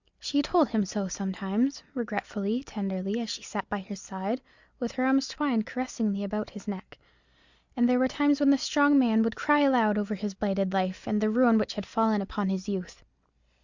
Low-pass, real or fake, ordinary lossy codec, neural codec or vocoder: 7.2 kHz; real; Opus, 64 kbps; none